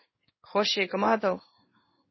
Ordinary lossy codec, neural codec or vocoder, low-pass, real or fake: MP3, 24 kbps; codec, 16 kHz in and 24 kHz out, 1 kbps, XY-Tokenizer; 7.2 kHz; fake